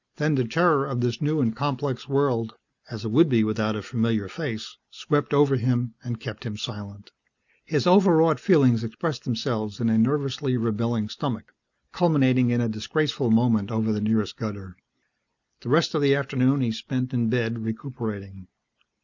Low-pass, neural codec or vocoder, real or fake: 7.2 kHz; none; real